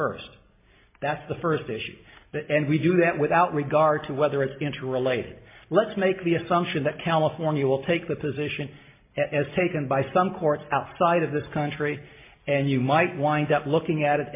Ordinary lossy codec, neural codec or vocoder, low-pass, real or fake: MP3, 24 kbps; none; 3.6 kHz; real